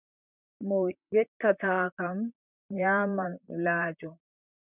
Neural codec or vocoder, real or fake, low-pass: vocoder, 44.1 kHz, 128 mel bands, Pupu-Vocoder; fake; 3.6 kHz